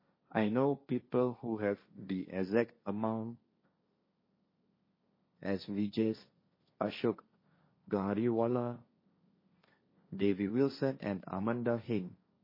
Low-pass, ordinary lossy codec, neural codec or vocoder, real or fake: 5.4 kHz; MP3, 24 kbps; codec, 16 kHz, 1.1 kbps, Voila-Tokenizer; fake